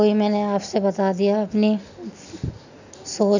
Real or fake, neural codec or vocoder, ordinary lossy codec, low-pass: real; none; none; 7.2 kHz